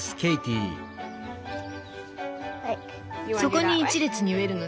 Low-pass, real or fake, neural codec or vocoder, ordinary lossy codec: none; real; none; none